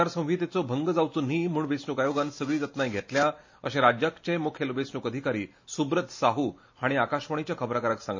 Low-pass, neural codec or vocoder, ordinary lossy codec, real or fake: 7.2 kHz; none; MP3, 32 kbps; real